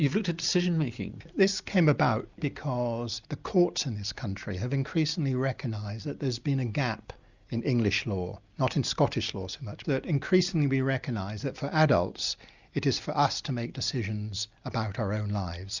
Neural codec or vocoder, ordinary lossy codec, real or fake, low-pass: none; Opus, 64 kbps; real; 7.2 kHz